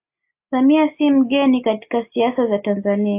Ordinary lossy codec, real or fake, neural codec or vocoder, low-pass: AAC, 24 kbps; real; none; 3.6 kHz